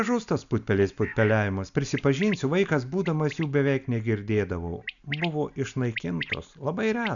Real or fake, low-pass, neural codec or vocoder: real; 7.2 kHz; none